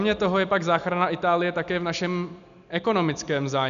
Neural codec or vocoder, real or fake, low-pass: none; real; 7.2 kHz